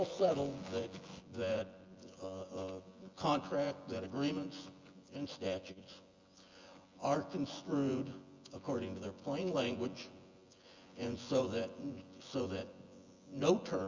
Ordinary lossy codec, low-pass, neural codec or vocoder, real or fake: Opus, 32 kbps; 7.2 kHz; vocoder, 24 kHz, 100 mel bands, Vocos; fake